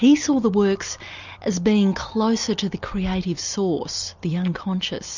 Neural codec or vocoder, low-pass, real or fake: none; 7.2 kHz; real